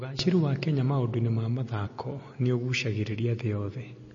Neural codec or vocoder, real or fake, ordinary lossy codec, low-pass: none; real; MP3, 32 kbps; 7.2 kHz